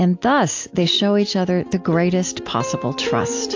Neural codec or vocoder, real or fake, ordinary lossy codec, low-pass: none; real; AAC, 48 kbps; 7.2 kHz